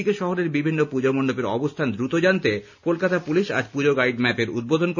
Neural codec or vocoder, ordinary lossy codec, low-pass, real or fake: none; none; 7.2 kHz; real